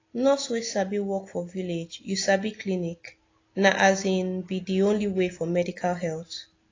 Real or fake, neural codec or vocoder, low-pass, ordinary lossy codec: real; none; 7.2 kHz; AAC, 32 kbps